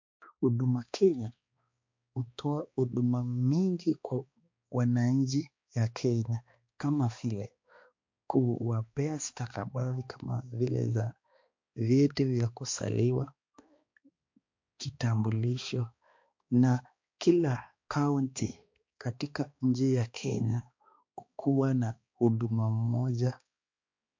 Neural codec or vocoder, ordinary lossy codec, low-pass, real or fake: codec, 16 kHz, 2 kbps, X-Codec, HuBERT features, trained on balanced general audio; MP3, 48 kbps; 7.2 kHz; fake